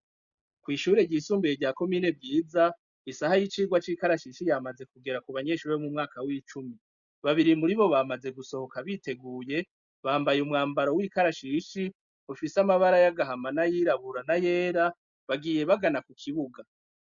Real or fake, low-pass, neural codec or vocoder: real; 7.2 kHz; none